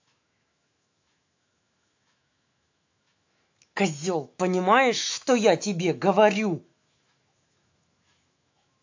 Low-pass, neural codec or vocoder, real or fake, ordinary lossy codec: 7.2 kHz; autoencoder, 48 kHz, 128 numbers a frame, DAC-VAE, trained on Japanese speech; fake; AAC, 48 kbps